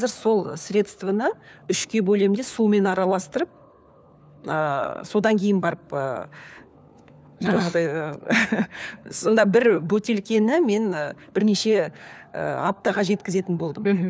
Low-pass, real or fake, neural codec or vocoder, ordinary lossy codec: none; fake; codec, 16 kHz, 4 kbps, FunCodec, trained on LibriTTS, 50 frames a second; none